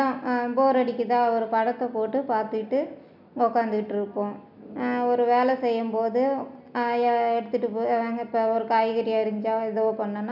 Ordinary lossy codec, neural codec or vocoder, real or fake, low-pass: none; none; real; 5.4 kHz